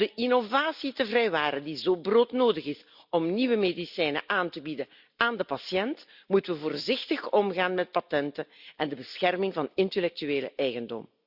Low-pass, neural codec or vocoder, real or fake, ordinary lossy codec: 5.4 kHz; none; real; Opus, 64 kbps